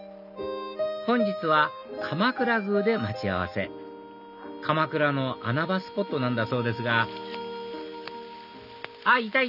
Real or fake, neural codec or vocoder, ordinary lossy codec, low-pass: real; none; none; 5.4 kHz